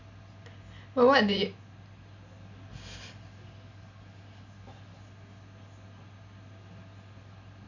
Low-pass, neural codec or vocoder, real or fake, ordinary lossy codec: 7.2 kHz; none; real; none